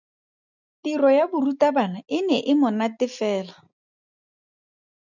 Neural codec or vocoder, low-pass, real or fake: none; 7.2 kHz; real